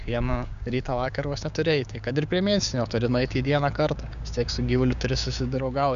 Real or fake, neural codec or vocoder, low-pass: fake; codec, 16 kHz, 6 kbps, DAC; 7.2 kHz